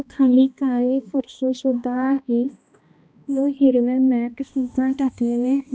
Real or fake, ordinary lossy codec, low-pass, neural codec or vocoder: fake; none; none; codec, 16 kHz, 1 kbps, X-Codec, HuBERT features, trained on balanced general audio